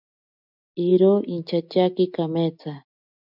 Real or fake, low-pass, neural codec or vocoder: real; 5.4 kHz; none